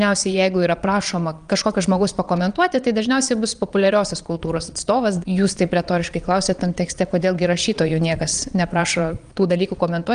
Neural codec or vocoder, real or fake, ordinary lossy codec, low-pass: vocoder, 22.05 kHz, 80 mel bands, Vocos; fake; Opus, 32 kbps; 9.9 kHz